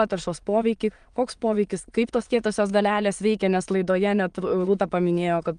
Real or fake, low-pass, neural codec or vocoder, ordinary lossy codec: fake; 9.9 kHz; autoencoder, 22.05 kHz, a latent of 192 numbers a frame, VITS, trained on many speakers; Opus, 32 kbps